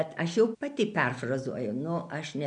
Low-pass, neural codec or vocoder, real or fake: 9.9 kHz; none; real